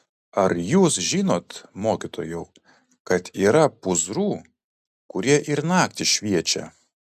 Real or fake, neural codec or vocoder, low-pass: real; none; 14.4 kHz